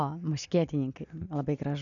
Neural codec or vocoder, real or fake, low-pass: none; real; 7.2 kHz